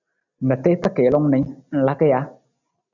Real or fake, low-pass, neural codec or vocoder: real; 7.2 kHz; none